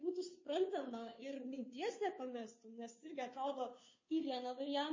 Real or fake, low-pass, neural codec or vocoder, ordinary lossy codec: fake; 7.2 kHz; codec, 16 kHz, 2 kbps, FunCodec, trained on Chinese and English, 25 frames a second; MP3, 32 kbps